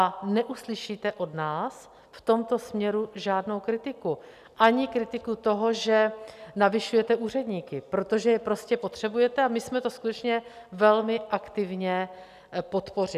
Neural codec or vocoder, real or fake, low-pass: none; real; 14.4 kHz